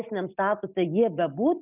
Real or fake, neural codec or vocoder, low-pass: real; none; 3.6 kHz